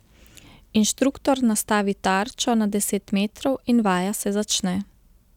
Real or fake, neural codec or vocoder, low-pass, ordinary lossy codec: real; none; 19.8 kHz; none